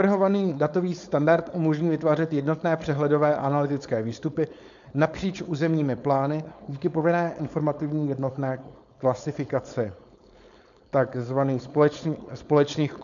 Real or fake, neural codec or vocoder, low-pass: fake; codec, 16 kHz, 4.8 kbps, FACodec; 7.2 kHz